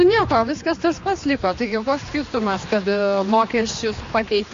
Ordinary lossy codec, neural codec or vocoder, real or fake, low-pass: AAC, 48 kbps; codec, 16 kHz, 2 kbps, X-Codec, HuBERT features, trained on general audio; fake; 7.2 kHz